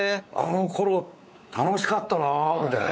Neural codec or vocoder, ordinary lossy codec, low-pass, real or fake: codec, 16 kHz, 4 kbps, X-Codec, WavLM features, trained on Multilingual LibriSpeech; none; none; fake